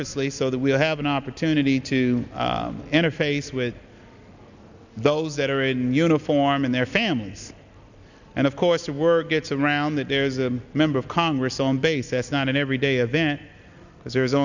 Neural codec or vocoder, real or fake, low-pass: none; real; 7.2 kHz